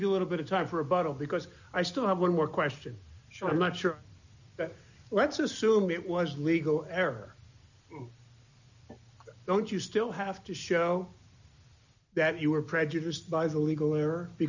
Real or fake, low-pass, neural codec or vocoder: real; 7.2 kHz; none